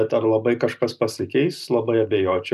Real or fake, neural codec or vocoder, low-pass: real; none; 14.4 kHz